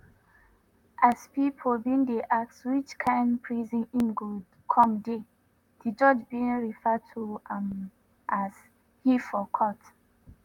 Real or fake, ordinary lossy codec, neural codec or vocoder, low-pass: fake; none; vocoder, 44.1 kHz, 128 mel bands, Pupu-Vocoder; 19.8 kHz